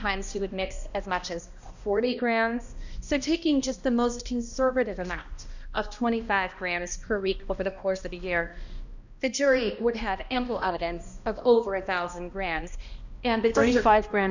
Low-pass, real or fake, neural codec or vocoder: 7.2 kHz; fake; codec, 16 kHz, 1 kbps, X-Codec, HuBERT features, trained on balanced general audio